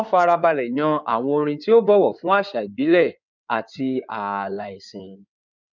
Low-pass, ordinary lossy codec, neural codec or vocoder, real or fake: 7.2 kHz; none; codec, 16 kHz in and 24 kHz out, 2.2 kbps, FireRedTTS-2 codec; fake